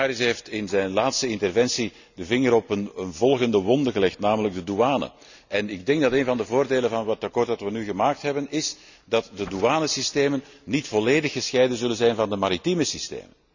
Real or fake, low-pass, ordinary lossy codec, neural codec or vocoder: real; 7.2 kHz; none; none